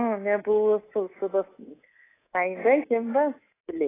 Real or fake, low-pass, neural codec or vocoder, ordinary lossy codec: fake; 3.6 kHz; codec, 24 kHz, 3.1 kbps, DualCodec; AAC, 16 kbps